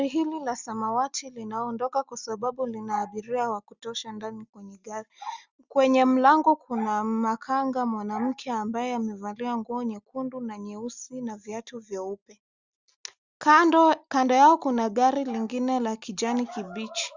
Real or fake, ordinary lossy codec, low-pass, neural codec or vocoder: real; Opus, 64 kbps; 7.2 kHz; none